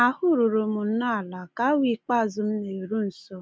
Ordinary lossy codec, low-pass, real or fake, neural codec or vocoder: none; none; real; none